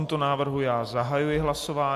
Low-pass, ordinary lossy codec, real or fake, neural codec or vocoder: 14.4 kHz; AAC, 64 kbps; real; none